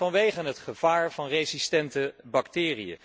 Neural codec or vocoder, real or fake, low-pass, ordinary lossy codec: none; real; none; none